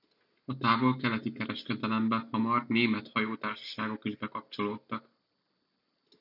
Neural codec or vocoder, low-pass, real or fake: none; 5.4 kHz; real